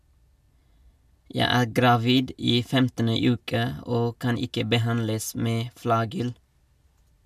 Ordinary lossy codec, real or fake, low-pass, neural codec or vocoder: MP3, 96 kbps; real; 14.4 kHz; none